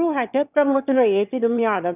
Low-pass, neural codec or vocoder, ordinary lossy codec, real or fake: 3.6 kHz; autoencoder, 22.05 kHz, a latent of 192 numbers a frame, VITS, trained on one speaker; none; fake